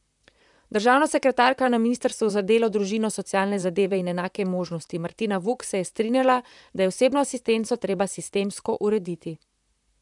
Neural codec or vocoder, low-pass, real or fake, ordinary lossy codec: vocoder, 44.1 kHz, 128 mel bands, Pupu-Vocoder; 10.8 kHz; fake; none